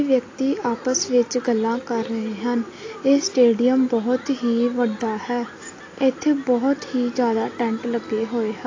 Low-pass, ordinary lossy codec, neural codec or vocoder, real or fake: 7.2 kHz; AAC, 32 kbps; none; real